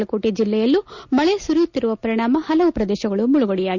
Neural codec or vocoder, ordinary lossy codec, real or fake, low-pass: none; none; real; 7.2 kHz